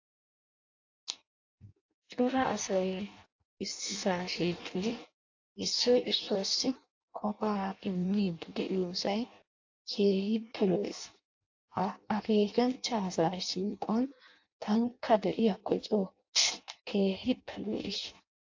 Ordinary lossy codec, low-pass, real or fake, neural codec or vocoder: AAC, 48 kbps; 7.2 kHz; fake; codec, 16 kHz in and 24 kHz out, 0.6 kbps, FireRedTTS-2 codec